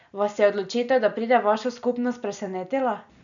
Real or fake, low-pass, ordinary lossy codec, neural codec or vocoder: real; 7.2 kHz; none; none